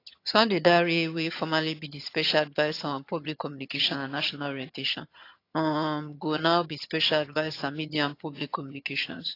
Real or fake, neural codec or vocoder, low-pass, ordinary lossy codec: fake; vocoder, 22.05 kHz, 80 mel bands, HiFi-GAN; 5.4 kHz; AAC, 32 kbps